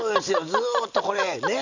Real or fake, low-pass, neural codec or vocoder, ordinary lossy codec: real; 7.2 kHz; none; none